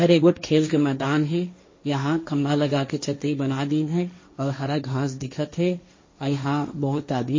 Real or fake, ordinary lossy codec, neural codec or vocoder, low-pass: fake; MP3, 32 kbps; codec, 16 kHz, 1.1 kbps, Voila-Tokenizer; 7.2 kHz